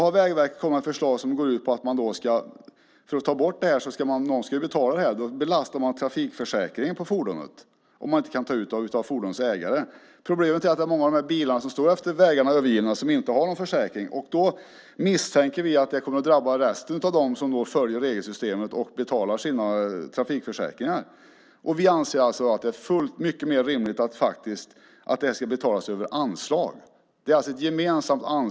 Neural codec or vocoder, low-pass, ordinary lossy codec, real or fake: none; none; none; real